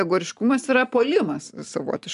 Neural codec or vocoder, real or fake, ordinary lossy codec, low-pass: none; real; MP3, 96 kbps; 10.8 kHz